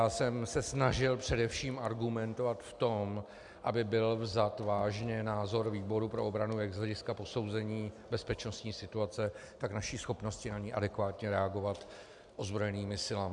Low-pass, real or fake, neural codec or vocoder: 10.8 kHz; real; none